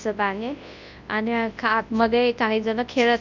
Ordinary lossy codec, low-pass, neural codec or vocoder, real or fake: none; 7.2 kHz; codec, 24 kHz, 0.9 kbps, WavTokenizer, large speech release; fake